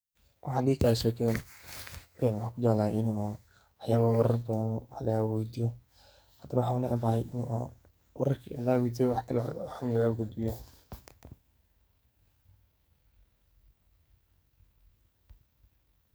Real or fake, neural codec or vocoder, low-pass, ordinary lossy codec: fake; codec, 44.1 kHz, 2.6 kbps, SNAC; none; none